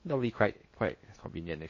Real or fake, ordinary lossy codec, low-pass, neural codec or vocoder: fake; MP3, 32 kbps; 7.2 kHz; codec, 16 kHz in and 24 kHz out, 0.6 kbps, FocalCodec, streaming, 4096 codes